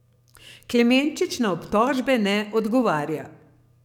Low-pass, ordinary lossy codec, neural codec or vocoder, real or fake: 19.8 kHz; none; codec, 44.1 kHz, 7.8 kbps, DAC; fake